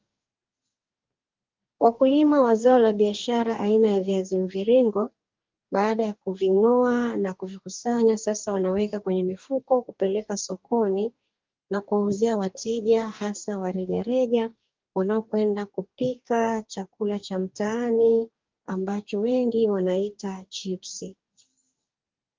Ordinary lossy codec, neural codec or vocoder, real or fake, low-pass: Opus, 24 kbps; codec, 44.1 kHz, 2.6 kbps, DAC; fake; 7.2 kHz